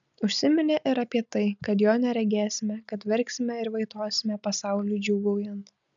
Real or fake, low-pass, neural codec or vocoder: real; 7.2 kHz; none